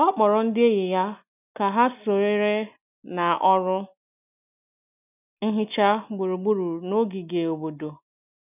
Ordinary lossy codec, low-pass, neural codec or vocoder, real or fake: none; 3.6 kHz; none; real